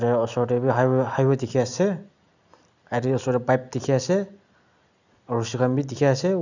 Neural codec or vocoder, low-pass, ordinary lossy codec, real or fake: none; 7.2 kHz; none; real